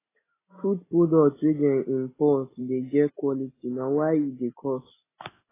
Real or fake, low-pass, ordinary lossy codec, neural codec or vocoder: real; 3.6 kHz; AAC, 16 kbps; none